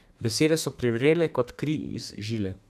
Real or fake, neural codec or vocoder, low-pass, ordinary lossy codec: fake; codec, 32 kHz, 1.9 kbps, SNAC; 14.4 kHz; none